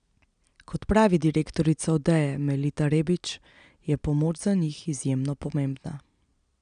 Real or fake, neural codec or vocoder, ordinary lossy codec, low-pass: real; none; none; 9.9 kHz